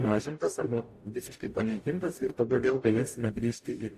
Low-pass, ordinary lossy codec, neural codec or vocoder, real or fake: 14.4 kHz; AAC, 64 kbps; codec, 44.1 kHz, 0.9 kbps, DAC; fake